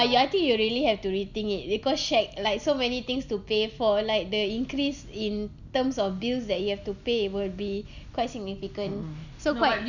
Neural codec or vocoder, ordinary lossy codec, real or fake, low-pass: none; none; real; 7.2 kHz